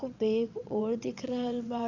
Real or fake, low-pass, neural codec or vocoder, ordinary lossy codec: fake; 7.2 kHz; vocoder, 22.05 kHz, 80 mel bands, WaveNeXt; none